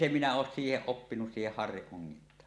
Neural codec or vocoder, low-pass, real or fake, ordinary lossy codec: none; none; real; none